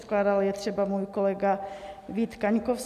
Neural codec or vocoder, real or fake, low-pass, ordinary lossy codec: none; real; 14.4 kHz; MP3, 96 kbps